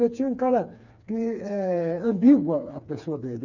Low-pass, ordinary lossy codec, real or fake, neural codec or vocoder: 7.2 kHz; none; fake; codec, 16 kHz, 4 kbps, FreqCodec, smaller model